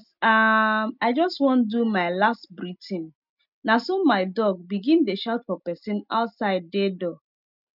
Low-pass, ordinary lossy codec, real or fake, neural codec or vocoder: 5.4 kHz; none; real; none